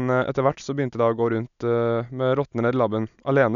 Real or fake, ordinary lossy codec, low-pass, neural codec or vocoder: real; none; 7.2 kHz; none